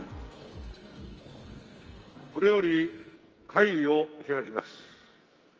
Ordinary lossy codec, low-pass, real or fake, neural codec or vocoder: Opus, 24 kbps; 7.2 kHz; fake; codec, 44.1 kHz, 2.6 kbps, SNAC